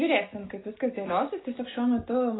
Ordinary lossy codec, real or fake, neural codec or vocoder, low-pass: AAC, 16 kbps; real; none; 7.2 kHz